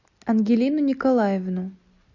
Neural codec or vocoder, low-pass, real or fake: none; 7.2 kHz; real